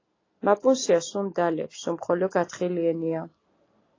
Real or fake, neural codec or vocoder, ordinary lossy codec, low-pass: real; none; AAC, 32 kbps; 7.2 kHz